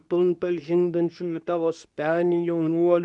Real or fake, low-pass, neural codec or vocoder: fake; 10.8 kHz; codec, 24 kHz, 0.9 kbps, WavTokenizer, medium speech release version 2